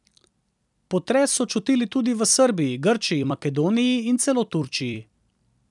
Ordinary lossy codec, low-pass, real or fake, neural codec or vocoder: none; 10.8 kHz; fake; vocoder, 44.1 kHz, 128 mel bands every 256 samples, BigVGAN v2